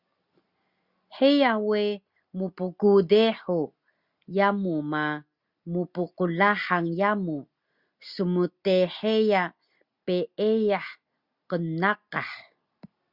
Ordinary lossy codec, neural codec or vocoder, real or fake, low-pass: Opus, 64 kbps; none; real; 5.4 kHz